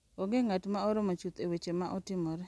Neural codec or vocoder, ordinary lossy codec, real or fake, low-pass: none; none; real; none